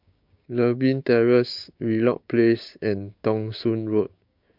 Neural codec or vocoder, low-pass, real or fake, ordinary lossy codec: codec, 16 kHz, 6 kbps, DAC; 5.4 kHz; fake; MP3, 48 kbps